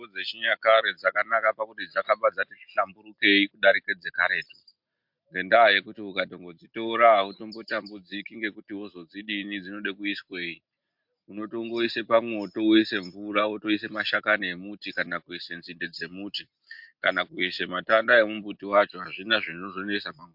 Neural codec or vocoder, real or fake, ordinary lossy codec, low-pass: none; real; MP3, 48 kbps; 5.4 kHz